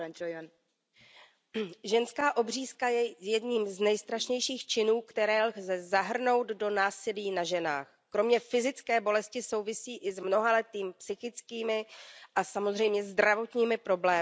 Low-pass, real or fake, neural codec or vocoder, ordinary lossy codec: none; real; none; none